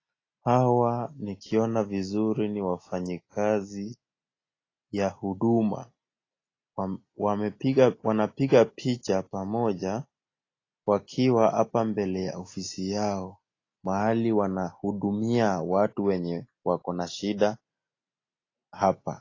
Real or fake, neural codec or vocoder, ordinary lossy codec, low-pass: real; none; AAC, 32 kbps; 7.2 kHz